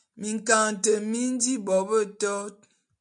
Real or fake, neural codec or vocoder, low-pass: real; none; 9.9 kHz